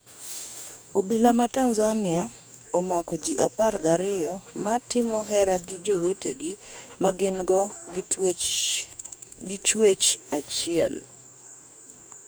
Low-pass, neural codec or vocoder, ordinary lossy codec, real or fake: none; codec, 44.1 kHz, 2.6 kbps, DAC; none; fake